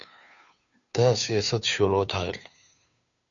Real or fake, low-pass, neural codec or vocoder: fake; 7.2 kHz; codec, 16 kHz, 2 kbps, FunCodec, trained on Chinese and English, 25 frames a second